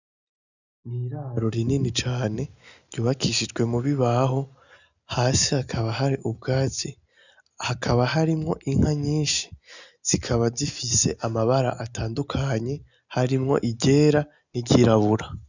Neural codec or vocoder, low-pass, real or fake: none; 7.2 kHz; real